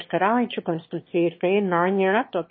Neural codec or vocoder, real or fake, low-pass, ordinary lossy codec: autoencoder, 22.05 kHz, a latent of 192 numbers a frame, VITS, trained on one speaker; fake; 7.2 kHz; MP3, 24 kbps